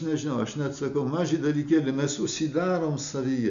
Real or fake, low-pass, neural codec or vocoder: real; 7.2 kHz; none